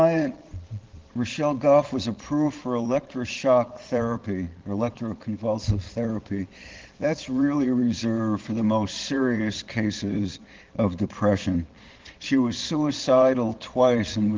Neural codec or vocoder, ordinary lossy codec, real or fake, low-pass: vocoder, 22.05 kHz, 80 mel bands, Vocos; Opus, 16 kbps; fake; 7.2 kHz